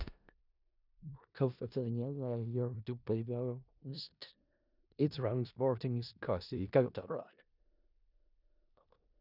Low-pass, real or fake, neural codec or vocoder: 5.4 kHz; fake; codec, 16 kHz in and 24 kHz out, 0.4 kbps, LongCat-Audio-Codec, four codebook decoder